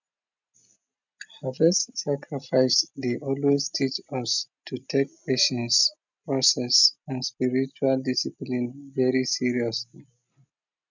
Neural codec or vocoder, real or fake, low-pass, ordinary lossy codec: none; real; 7.2 kHz; none